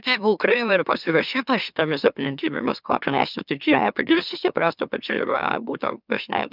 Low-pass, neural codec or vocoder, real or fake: 5.4 kHz; autoencoder, 44.1 kHz, a latent of 192 numbers a frame, MeloTTS; fake